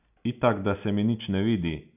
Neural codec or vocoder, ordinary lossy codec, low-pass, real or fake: none; none; 3.6 kHz; real